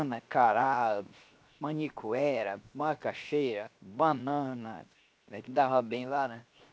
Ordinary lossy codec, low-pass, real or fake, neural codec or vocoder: none; none; fake; codec, 16 kHz, 0.7 kbps, FocalCodec